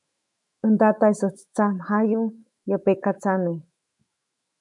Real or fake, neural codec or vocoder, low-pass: fake; autoencoder, 48 kHz, 128 numbers a frame, DAC-VAE, trained on Japanese speech; 10.8 kHz